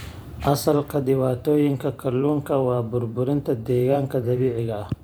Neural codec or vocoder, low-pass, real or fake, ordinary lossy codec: vocoder, 44.1 kHz, 128 mel bands every 512 samples, BigVGAN v2; none; fake; none